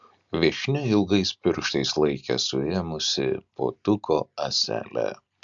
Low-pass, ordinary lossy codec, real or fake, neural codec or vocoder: 7.2 kHz; MP3, 64 kbps; real; none